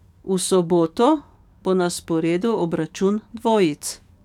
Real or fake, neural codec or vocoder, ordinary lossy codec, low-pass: fake; codec, 44.1 kHz, 7.8 kbps, DAC; none; 19.8 kHz